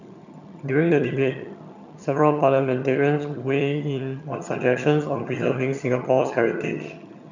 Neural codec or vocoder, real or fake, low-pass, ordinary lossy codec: vocoder, 22.05 kHz, 80 mel bands, HiFi-GAN; fake; 7.2 kHz; none